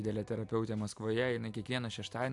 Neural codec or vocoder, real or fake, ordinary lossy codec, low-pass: none; real; AAC, 64 kbps; 10.8 kHz